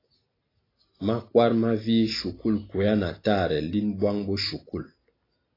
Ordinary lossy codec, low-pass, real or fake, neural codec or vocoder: AAC, 24 kbps; 5.4 kHz; real; none